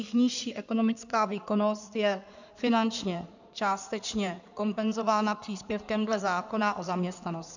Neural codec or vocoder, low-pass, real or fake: codec, 16 kHz in and 24 kHz out, 2.2 kbps, FireRedTTS-2 codec; 7.2 kHz; fake